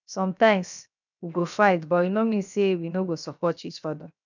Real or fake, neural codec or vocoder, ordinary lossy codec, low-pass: fake; codec, 16 kHz, 0.7 kbps, FocalCodec; none; 7.2 kHz